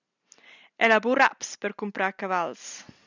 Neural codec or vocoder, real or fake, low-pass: none; real; 7.2 kHz